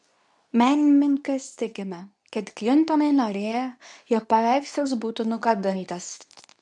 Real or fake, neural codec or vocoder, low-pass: fake; codec, 24 kHz, 0.9 kbps, WavTokenizer, medium speech release version 2; 10.8 kHz